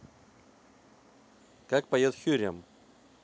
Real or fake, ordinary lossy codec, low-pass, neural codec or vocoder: real; none; none; none